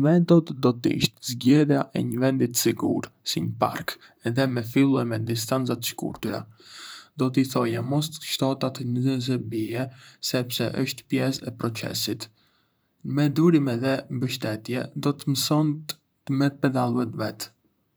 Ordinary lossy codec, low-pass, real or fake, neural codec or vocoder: none; none; fake; vocoder, 44.1 kHz, 128 mel bands, Pupu-Vocoder